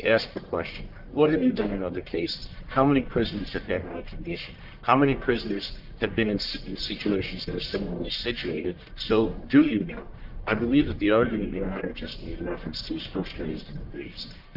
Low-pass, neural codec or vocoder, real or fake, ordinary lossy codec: 5.4 kHz; codec, 44.1 kHz, 1.7 kbps, Pupu-Codec; fake; Opus, 24 kbps